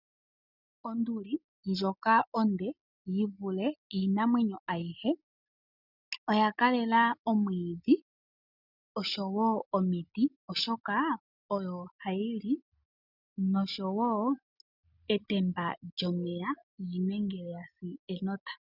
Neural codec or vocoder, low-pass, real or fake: none; 5.4 kHz; real